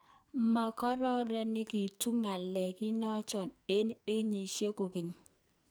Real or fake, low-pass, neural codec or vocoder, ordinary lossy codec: fake; none; codec, 44.1 kHz, 2.6 kbps, SNAC; none